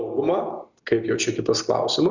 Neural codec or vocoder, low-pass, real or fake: none; 7.2 kHz; real